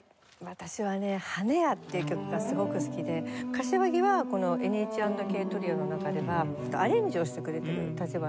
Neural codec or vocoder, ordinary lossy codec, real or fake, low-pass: none; none; real; none